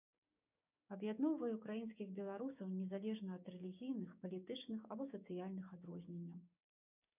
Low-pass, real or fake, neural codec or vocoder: 3.6 kHz; fake; codec, 16 kHz, 6 kbps, DAC